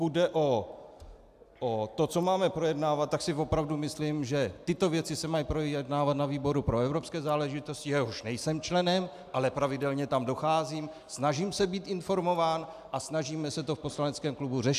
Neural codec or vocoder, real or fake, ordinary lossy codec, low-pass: none; real; AAC, 96 kbps; 14.4 kHz